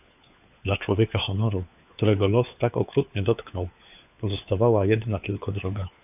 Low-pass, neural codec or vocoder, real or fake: 3.6 kHz; codec, 16 kHz, 4 kbps, FunCodec, trained on LibriTTS, 50 frames a second; fake